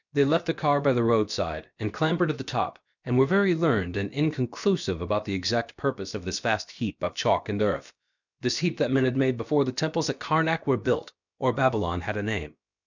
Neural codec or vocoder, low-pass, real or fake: codec, 16 kHz, about 1 kbps, DyCAST, with the encoder's durations; 7.2 kHz; fake